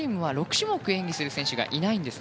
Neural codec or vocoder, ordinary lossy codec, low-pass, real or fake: none; none; none; real